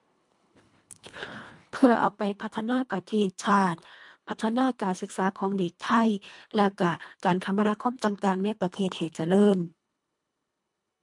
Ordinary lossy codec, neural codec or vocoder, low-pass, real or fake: MP3, 64 kbps; codec, 24 kHz, 1.5 kbps, HILCodec; 10.8 kHz; fake